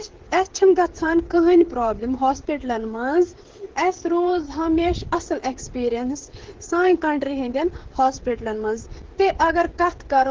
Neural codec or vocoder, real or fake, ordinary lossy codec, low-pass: codec, 16 kHz, 8 kbps, FreqCodec, smaller model; fake; Opus, 16 kbps; 7.2 kHz